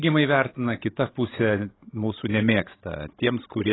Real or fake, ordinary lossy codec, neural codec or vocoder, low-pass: fake; AAC, 16 kbps; codec, 16 kHz, 16 kbps, FreqCodec, larger model; 7.2 kHz